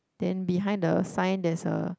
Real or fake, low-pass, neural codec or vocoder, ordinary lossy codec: real; none; none; none